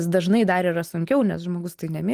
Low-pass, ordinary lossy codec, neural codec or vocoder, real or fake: 14.4 kHz; Opus, 24 kbps; none; real